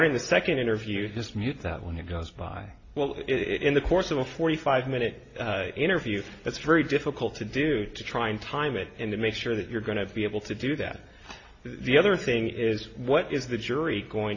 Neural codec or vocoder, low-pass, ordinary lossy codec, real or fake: none; 7.2 kHz; AAC, 32 kbps; real